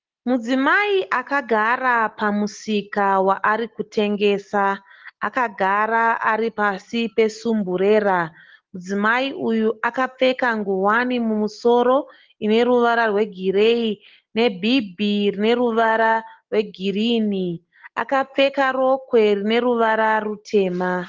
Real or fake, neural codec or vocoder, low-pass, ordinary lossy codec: real; none; 7.2 kHz; Opus, 16 kbps